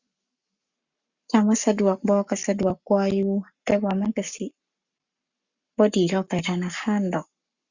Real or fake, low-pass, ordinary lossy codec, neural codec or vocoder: fake; 7.2 kHz; Opus, 64 kbps; codec, 44.1 kHz, 7.8 kbps, Pupu-Codec